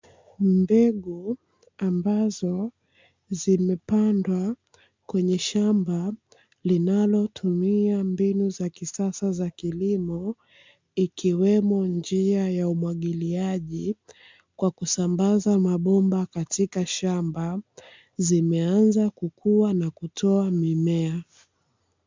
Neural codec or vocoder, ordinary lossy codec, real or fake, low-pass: none; MP3, 64 kbps; real; 7.2 kHz